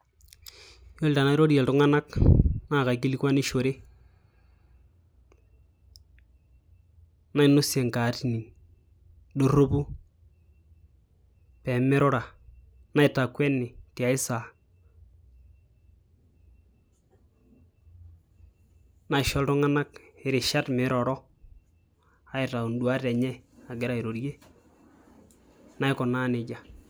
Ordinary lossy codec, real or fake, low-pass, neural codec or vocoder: none; real; none; none